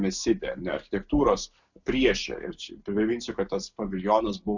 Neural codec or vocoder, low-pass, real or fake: none; 7.2 kHz; real